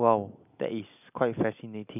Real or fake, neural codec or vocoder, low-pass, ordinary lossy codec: real; none; 3.6 kHz; none